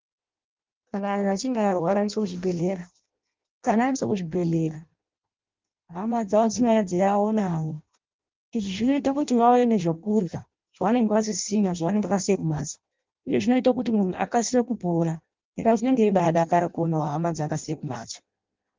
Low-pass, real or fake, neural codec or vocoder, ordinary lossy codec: 7.2 kHz; fake; codec, 16 kHz in and 24 kHz out, 0.6 kbps, FireRedTTS-2 codec; Opus, 32 kbps